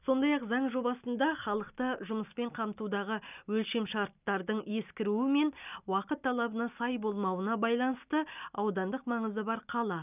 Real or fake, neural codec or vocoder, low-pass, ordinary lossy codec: real; none; 3.6 kHz; none